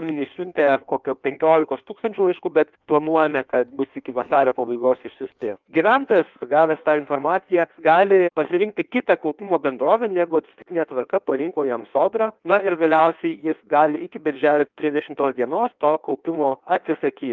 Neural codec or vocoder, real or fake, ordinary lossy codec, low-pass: codec, 16 kHz in and 24 kHz out, 1.1 kbps, FireRedTTS-2 codec; fake; Opus, 24 kbps; 7.2 kHz